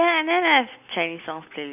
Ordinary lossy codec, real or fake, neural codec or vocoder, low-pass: none; real; none; 3.6 kHz